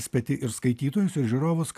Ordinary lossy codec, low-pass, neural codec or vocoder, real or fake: AAC, 96 kbps; 14.4 kHz; none; real